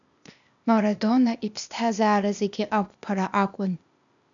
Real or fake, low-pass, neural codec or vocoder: fake; 7.2 kHz; codec, 16 kHz, 0.8 kbps, ZipCodec